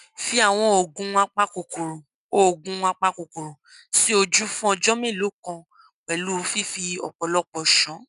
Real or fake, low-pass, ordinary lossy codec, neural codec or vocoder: real; 10.8 kHz; none; none